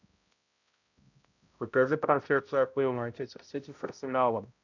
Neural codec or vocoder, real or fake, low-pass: codec, 16 kHz, 0.5 kbps, X-Codec, HuBERT features, trained on balanced general audio; fake; 7.2 kHz